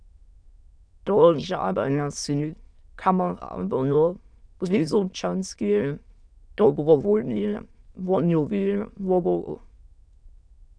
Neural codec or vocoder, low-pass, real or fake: autoencoder, 22.05 kHz, a latent of 192 numbers a frame, VITS, trained on many speakers; 9.9 kHz; fake